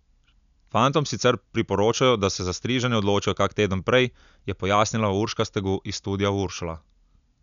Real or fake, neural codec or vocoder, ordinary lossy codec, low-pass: real; none; none; 7.2 kHz